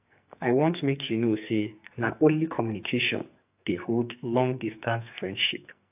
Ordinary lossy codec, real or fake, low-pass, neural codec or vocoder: AAC, 32 kbps; fake; 3.6 kHz; codec, 44.1 kHz, 2.6 kbps, SNAC